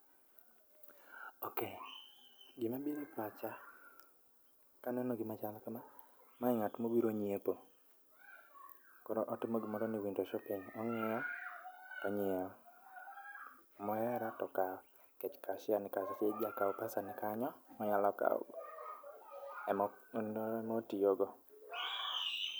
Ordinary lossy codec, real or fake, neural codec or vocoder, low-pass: none; real; none; none